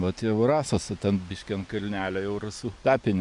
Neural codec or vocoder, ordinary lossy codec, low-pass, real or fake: none; MP3, 96 kbps; 10.8 kHz; real